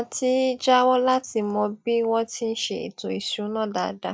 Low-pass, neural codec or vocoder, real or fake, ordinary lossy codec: none; none; real; none